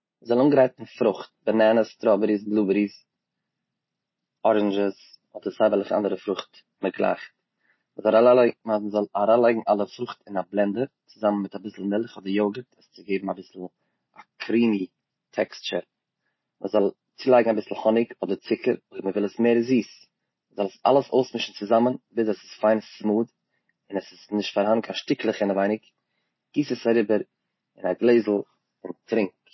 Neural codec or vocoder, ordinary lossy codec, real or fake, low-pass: none; MP3, 24 kbps; real; 7.2 kHz